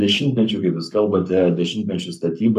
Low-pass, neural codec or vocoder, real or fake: 14.4 kHz; codec, 44.1 kHz, 7.8 kbps, Pupu-Codec; fake